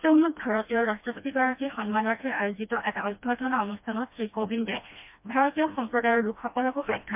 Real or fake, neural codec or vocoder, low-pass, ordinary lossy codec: fake; codec, 16 kHz, 1 kbps, FreqCodec, smaller model; 3.6 kHz; MP3, 32 kbps